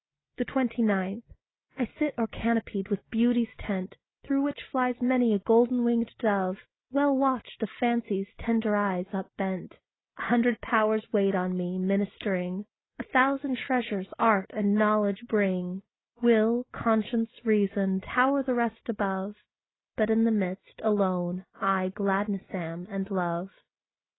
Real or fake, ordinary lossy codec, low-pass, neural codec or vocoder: real; AAC, 16 kbps; 7.2 kHz; none